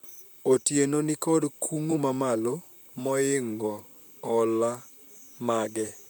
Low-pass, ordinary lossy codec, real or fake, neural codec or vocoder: none; none; fake; vocoder, 44.1 kHz, 128 mel bands, Pupu-Vocoder